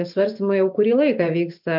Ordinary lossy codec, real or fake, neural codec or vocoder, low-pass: MP3, 48 kbps; real; none; 5.4 kHz